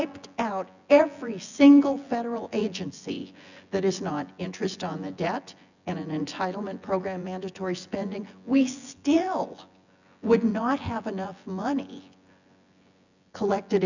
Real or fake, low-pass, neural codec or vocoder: fake; 7.2 kHz; vocoder, 24 kHz, 100 mel bands, Vocos